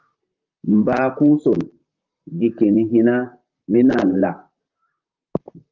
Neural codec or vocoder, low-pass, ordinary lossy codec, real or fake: vocoder, 44.1 kHz, 128 mel bands, Pupu-Vocoder; 7.2 kHz; Opus, 32 kbps; fake